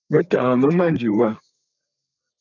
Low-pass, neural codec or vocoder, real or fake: 7.2 kHz; codec, 32 kHz, 1.9 kbps, SNAC; fake